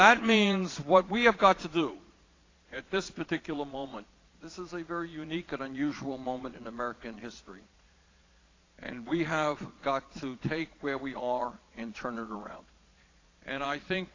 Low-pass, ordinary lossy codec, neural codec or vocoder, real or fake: 7.2 kHz; AAC, 32 kbps; vocoder, 22.05 kHz, 80 mel bands, Vocos; fake